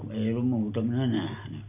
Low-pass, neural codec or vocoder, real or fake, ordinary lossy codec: 3.6 kHz; none; real; none